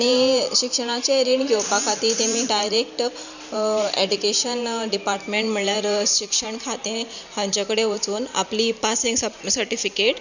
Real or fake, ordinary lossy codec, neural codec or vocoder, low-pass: fake; none; vocoder, 44.1 kHz, 128 mel bands every 512 samples, BigVGAN v2; 7.2 kHz